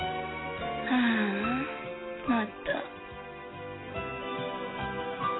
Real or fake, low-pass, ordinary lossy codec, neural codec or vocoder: real; 7.2 kHz; AAC, 16 kbps; none